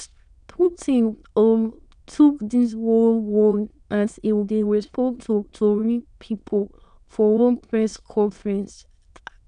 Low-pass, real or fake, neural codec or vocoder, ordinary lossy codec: 9.9 kHz; fake; autoencoder, 22.05 kHz, a latent of 192 numbers a frame, VITS, trained on many speakers; none